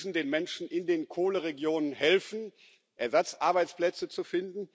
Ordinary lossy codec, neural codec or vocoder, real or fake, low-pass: none; none; real; none